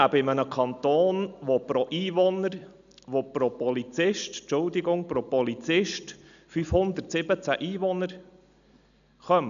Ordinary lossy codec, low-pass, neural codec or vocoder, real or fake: none; 7.2 kHz; none; real